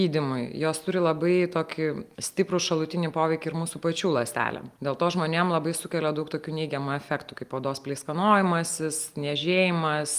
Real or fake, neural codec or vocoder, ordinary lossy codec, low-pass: real; none; Opus, 32 kbps; 14.4 kHz